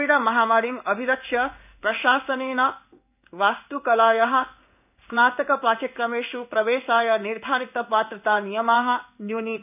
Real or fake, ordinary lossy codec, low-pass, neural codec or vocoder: fake; none; 3.6 kHz; codec, 16 kHz in and 24 kHz out, 1 kbps, XY-Tokenizer